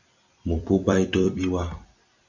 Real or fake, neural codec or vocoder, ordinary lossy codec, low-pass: fake; vocoder, 44.1 kHz, 128 mel bands every 256 samples, BigVGAN v2; AAC, 48 kbps; 7.2 kHz